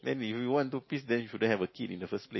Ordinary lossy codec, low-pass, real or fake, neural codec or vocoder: MP3, 24 kbps; 7.2 kHz; real; none